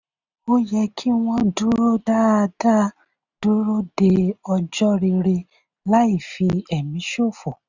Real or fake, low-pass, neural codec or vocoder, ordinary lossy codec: real; 7.2 kHz; none; none